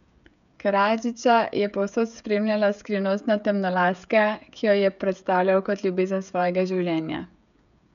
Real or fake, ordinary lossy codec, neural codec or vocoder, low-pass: fake; none; codec, 16 kHz, 16 kbps, FreqCodec, smaller model; 7.2 kHz